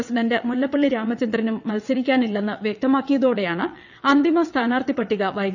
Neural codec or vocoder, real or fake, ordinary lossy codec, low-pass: vocoder, 22.05 kHz, 80 mel bands, WaveNeXt; fake; none; 7.2 kHz